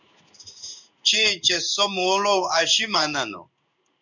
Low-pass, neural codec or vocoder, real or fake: 7.2 kHz; codec, 16 kHz in and 24 kHz out, 1 kbps, XY-Tokenizer; fake